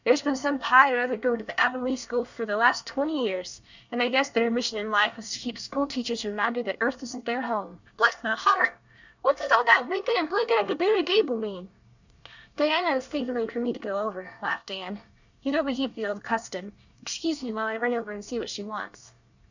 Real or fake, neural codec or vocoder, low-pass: fake; codec, 24 kHz, 1 kbps, SNAC; 7.2 kHz